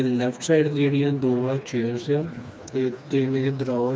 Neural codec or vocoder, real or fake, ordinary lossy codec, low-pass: codec, 16 kHz, 2 kbps, FreqCodec, smaller model; fake; none; none